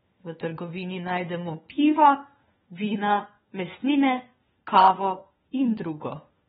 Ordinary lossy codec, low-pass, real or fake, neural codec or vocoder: AAC, 16 kbps; 7.2 kHz; fake; codec, 16 kHz, 4 kbps, FunCodec, trained on LibriTTS, 50 frames a second